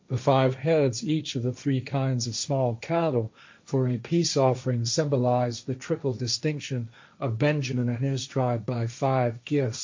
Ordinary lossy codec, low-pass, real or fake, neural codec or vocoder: MP3, 48 kbps; 7.2 kHz; fake; codec, 16 kHz, 1.1 kbps, Voila-Tokenizer